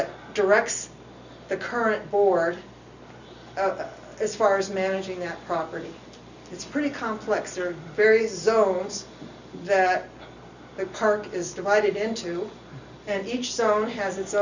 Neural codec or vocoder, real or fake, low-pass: none; real; 7.2 kHz